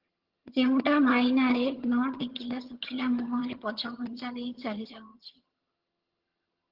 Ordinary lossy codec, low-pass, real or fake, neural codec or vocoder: Opus, 16 kbps; 5.4 kHz; fake; vocoder, 22.05 kHz, 80 mel bands, HiFi-GAN